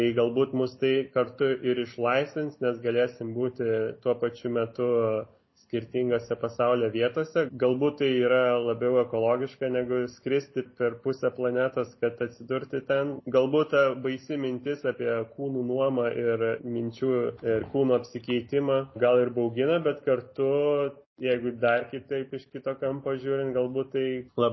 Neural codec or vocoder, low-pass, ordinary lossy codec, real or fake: none; 7.2 kHz; MP3, 24 kbps; real